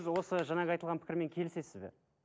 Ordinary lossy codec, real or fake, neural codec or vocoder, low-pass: none; real; none; none